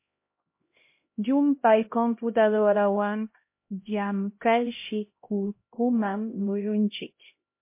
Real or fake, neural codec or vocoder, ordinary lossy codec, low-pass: fake; codec, 16 kHz, 0.5 kbps, X-Codec, HuBERT features, trained on LibriSpeech; MP3, 24 kbps; 3.6 kHz